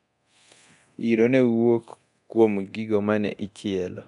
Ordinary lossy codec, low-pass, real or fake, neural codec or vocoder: none; 10.8 kHz; fake; codec, 24 kHz, 0.9 kbps, DualCodec